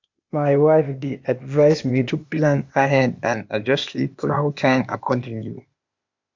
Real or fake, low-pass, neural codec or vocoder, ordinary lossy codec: fake; 7.2 kHz; codec, 16 kHz, 0.8 kbps, ZipCodec; MP3, 64 kbps